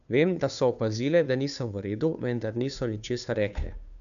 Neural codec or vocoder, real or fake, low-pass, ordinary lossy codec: codec, 16 kHz, 2 kbps, FunCodec, trained on Chinese and English, 25 frames a second; fake; 7.2 kHz; none